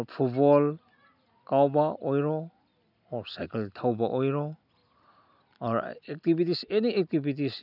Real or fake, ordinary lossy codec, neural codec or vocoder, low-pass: real; none; none; 5.4 kHz